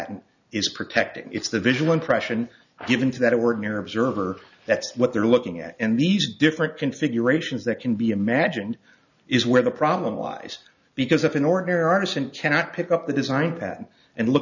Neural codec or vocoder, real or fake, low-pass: none; real; 7.2 kHz